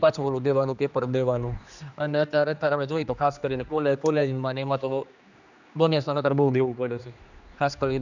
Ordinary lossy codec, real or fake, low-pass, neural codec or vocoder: none; fake; 7.2 kHz; codec, 16 kHz, 2 kbps, X-Codec, HuBERT features, trained on general audio